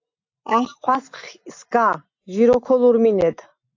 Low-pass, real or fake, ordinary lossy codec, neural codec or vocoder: 7.2 kHz; real; AAC, 48 kbps; none